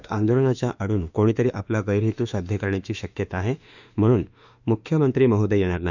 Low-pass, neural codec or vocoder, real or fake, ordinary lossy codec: 7.2 kHz; autoencoder, 48 kHz, 32 numbers a frame, DAC-VAE, trained on Japanese speech; fake; none